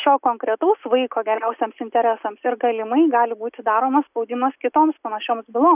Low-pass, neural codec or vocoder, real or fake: 3.6 kHz; none; real